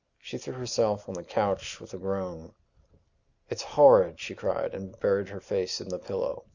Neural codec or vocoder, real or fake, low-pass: none; real; 7.2 kHz